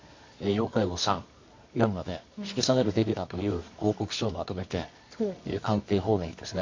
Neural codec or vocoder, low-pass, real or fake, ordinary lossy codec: codec, 24 kHz, 0.9 kbps, WavTokenizer, medium music audio release; 7.2 kHz; fake; MP3, 48 kbps